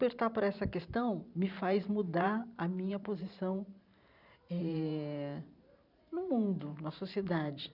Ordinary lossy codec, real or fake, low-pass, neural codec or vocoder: none; fake; 5.4 kHz; vocoder, 44.1 kHz, 128 mel bands every 512 samples, BigVGAN v2